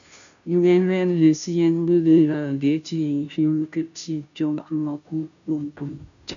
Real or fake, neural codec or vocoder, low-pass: fake; codec, 16 kHz, 0.5 kbps, FunCodec, trained on Chinese and English, 25 frames a second; 7.2 kHz